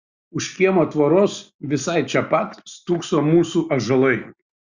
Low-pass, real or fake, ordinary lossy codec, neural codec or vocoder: 7.2 kHz; real; Opus, 64 kbps; none